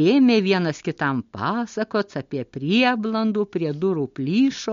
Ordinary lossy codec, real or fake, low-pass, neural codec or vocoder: MP3, 48 kbps; real; 7.2 kHz; none